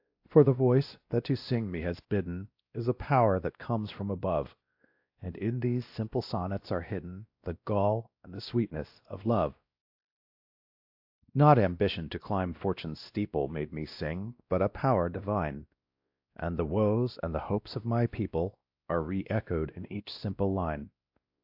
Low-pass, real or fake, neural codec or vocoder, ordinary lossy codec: 5.4 kHz; fake; codec, 16 kHz, 1 kbps, X-Codec, WavLM features, trained on Multilingual LibriSpeech; AAC, 48 kbps